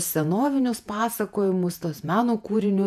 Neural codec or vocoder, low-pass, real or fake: vocoder, 48 kHz, 128 mel bands, Vocos; 14.4 kHz; fake